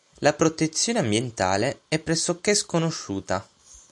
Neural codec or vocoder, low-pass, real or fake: none; 10.8 kHz; real